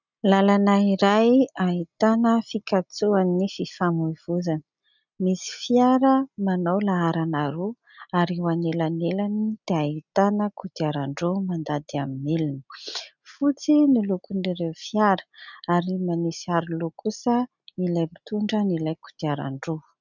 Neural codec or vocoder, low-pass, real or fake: none; 7.2 kHz; real